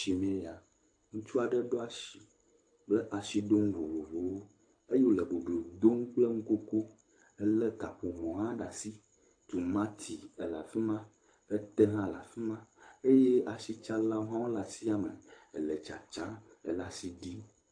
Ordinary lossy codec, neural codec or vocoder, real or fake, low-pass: AAC, 48 kbps; codec, 24 kHz, 6 kbps, HILCodec; fake; 9.9 kHz